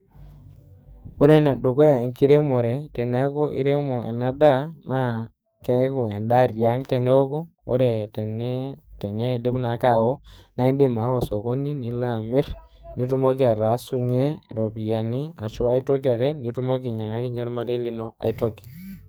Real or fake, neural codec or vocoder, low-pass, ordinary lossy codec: fake; codec, 44.1 kHz, 2.6 kbps, SNAC; none; none